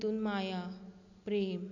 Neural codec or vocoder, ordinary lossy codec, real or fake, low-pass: none; none; real; 7.2 kHz